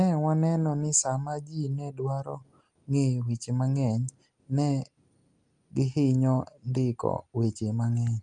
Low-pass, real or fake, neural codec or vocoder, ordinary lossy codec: 9.9 kHz; real; none; Opus, 32 kbps